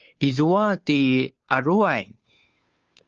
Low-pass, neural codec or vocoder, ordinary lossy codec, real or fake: 7.2 kHz; codec, 16 kHz, 2 kbps, FunCodec, trained on Chinese and English, 25 frames a second; Opus, 24 kbps; fake